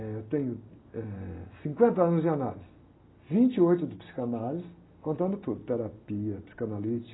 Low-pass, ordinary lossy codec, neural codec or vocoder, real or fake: 7.2 kHz; AAC, 16 kbps; none; real